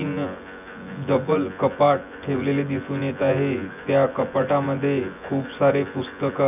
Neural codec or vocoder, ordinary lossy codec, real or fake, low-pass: vocoder, 24 kHz, 100 mel bands, Vocos; none; fake; 3.6 kHz